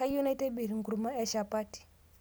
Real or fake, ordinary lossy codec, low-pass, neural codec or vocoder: real; none; none; none